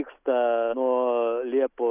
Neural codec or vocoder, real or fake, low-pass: none; real; 3.6 kHz